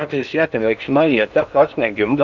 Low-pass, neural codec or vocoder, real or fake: 7.2 kHz; codec, 16 kHz in and 24 kHz out, 0.8 kbps, FocalCodec, streaming, 65536 codes; fake